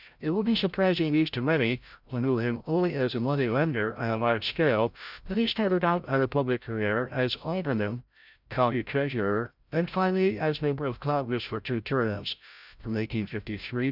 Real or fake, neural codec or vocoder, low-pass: fake; codec, 16 kHz, 0.5 kbps, FreqCodec, larger model; 5.4 kHz